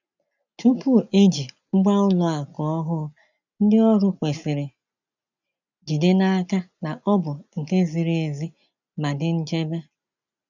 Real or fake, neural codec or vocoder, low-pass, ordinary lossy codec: real; none; 7.2 kHz; none